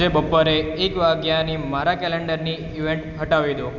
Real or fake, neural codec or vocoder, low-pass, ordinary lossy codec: real; none; 7.2 kHz; none